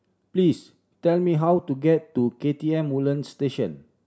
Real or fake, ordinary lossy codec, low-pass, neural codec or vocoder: real; none; none; none